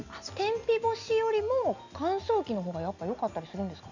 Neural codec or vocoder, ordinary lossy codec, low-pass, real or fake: none; none; 7.2 kHz; real